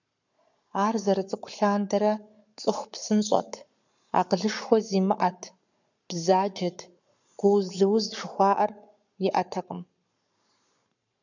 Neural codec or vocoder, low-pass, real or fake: codec, 44.1 kHz, 7.8 kbps, Pupu-Codec; 7.2 kHz; fake